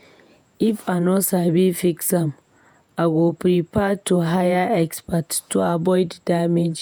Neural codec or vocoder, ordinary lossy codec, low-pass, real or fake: vocoder, 48 kHz, 128 mel bands, Vocos; none; none; fake